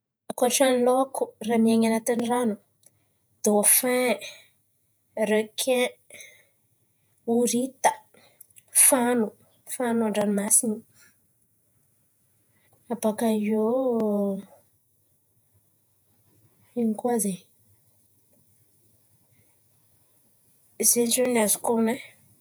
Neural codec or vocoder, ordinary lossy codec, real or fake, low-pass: vocoder, 48 kHz, 128 mel bands, Vocos; none; fake; none